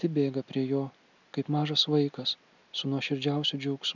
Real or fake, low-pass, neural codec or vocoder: real; 7.2 kHz; none